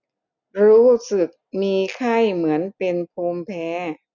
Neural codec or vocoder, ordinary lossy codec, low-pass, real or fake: none; none; 7.2 kHz; real